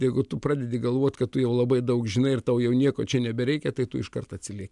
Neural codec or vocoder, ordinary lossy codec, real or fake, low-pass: none; MP3, 96 kbps; real; 10.8 kHz